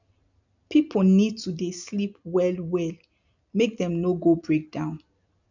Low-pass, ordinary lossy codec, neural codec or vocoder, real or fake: 7.2 kHz; none; none; real